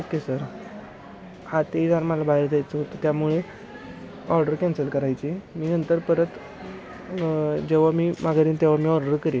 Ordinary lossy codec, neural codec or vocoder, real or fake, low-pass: none; none; real; none